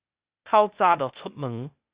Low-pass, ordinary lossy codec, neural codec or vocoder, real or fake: 3.6 kHz; Opus, 64 kbps; codec, 16 kHz, 0.8 kbps, ZipCodec; fake